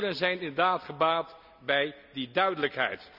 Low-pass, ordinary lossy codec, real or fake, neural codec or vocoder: 5.4 kHz; none; real; none